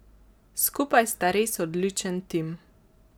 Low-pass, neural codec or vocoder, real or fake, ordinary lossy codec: none; none; real; none